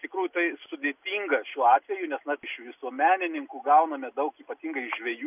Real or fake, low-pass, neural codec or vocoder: real; 3.6 kHz; none